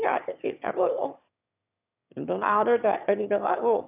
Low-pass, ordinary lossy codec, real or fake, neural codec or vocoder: 3.6 kHz; none; fake; autoencoder, 22.05 kHz, a latent of 192 numbers a frame, VITS, trained on one speaker